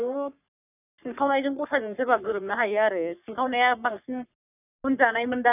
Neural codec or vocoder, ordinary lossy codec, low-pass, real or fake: codec, 44.1 kHz, 3.4 kbps, Pupu-Codec; none; 3.6 kHz; fake